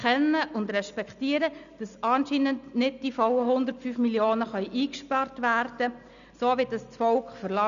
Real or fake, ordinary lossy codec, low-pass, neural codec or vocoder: real; none; 7.2 kHz; none